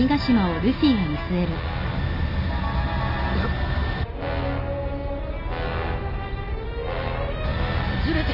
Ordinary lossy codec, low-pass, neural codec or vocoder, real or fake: none; 5.4 kHz; none; real